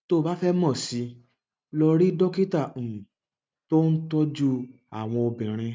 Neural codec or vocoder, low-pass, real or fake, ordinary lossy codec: none; none; real; none